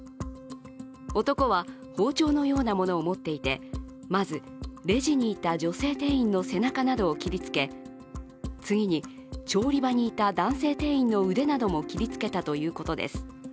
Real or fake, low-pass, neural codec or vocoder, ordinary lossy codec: real; none; none; none